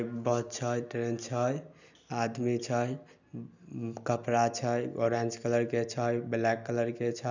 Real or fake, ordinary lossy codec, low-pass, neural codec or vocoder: real; none; 7.2 kHz; none